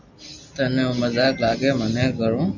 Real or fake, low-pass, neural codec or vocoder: real; 7.2 kHz; none